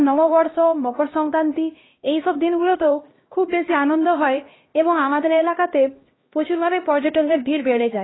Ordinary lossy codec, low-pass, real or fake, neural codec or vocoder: AAC, 16 kbps; 7.2 kHz; fake; codec, 16 kHz, 1 kbps, X-Codec, HuBERT features, trained on LibriSpeech